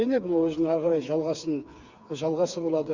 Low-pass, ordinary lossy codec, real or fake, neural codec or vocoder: 7.2 kHz; Opus, 64 kbps; fake; codec, 16 kHz, 4 kbps, FreqCodec, smaller model